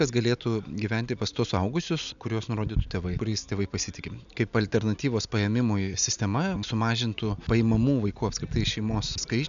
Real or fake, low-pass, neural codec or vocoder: real; 7.2 kHz; none